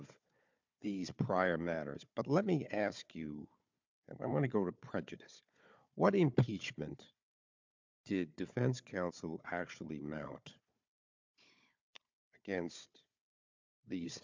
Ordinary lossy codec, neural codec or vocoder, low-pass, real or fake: MP3, 64 kbps; codec, 16 kHz, 4 kbps, FunCodec, trained on Chinese and English, 50 frames a second; 7.2 kHz; fake